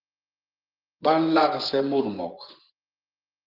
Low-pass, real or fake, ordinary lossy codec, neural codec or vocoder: 5.4 kHz; fake; Opus, 16 kbps; vocoder, 24 kHz, 100 mel bands, Vocos